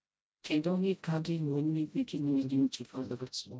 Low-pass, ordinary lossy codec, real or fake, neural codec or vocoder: none; none; fake; codec, 16 kHz, 0.5 kbps, FreqCodec, smaller model